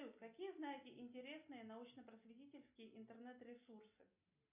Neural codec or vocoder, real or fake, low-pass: none; real; 3.6 kHz